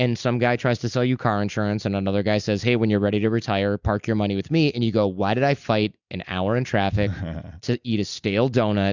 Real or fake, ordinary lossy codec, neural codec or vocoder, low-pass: real; Opus, 64 kbps; none; 7.2 kHz